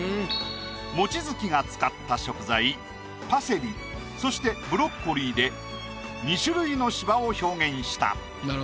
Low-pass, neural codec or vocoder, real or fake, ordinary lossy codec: none; none; real; none